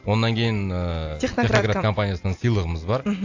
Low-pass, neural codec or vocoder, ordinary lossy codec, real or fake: 7.2 kHz; none; AAC, 48 kbps; real